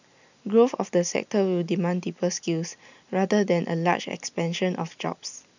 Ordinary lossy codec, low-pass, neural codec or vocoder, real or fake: none; 7.2 kHz; none; real